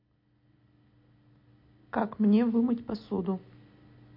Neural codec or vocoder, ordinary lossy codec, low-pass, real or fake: none; MP3, 32 kbps; 5.4 kHz; real